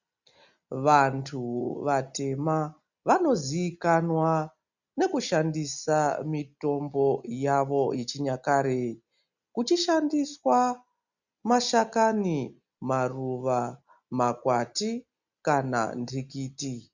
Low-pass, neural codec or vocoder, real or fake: 7.2 kHz; none; real